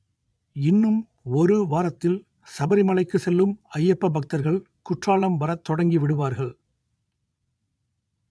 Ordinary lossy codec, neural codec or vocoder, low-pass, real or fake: none; vocoder, 22.05 kHz, 80 mel bands, Vocos; none; fake